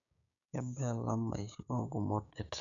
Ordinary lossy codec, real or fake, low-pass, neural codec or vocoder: none; fake; 7.2 kHz; codec, 16 kHz, 6 kbps, DAC